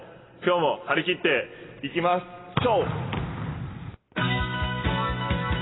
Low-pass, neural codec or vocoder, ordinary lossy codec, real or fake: 7.2 kHz; none; AAC, 16 kbps; real